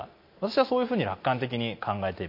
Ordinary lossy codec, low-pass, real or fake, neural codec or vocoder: MP3, 32 kbps; 5.4 kHz; real; none